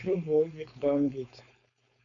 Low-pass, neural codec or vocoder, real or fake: 7.2 kHz; codec, 16 kHz, 4.8 kbps, FACodec; fake